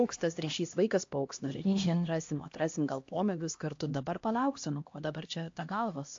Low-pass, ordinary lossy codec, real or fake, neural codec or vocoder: 7.2 kHz; AAC, 48 kbps; fake; codec, 16 kHz, 1 kbps, X-Codec, HuBERT features, trained on LibriSpeech